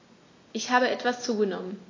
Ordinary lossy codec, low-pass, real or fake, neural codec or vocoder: AAC, 32 kbps; 7.2 kHz; real; none